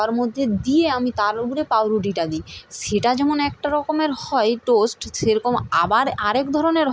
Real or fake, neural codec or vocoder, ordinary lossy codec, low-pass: real; none; none; none